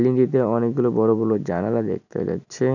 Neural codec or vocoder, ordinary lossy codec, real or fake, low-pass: none; none; real; 7.2 kHz